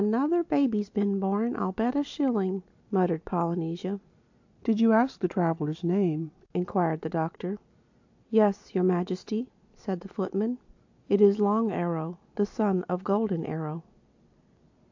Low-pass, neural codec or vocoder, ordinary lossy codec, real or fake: 7.2 kHz; none; AAC, 48 kbps; real